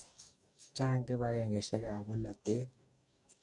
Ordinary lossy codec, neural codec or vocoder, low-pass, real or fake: none; codec, 44.1 kHz, 2.6 kbps, DAC; 10.8 kHz; fake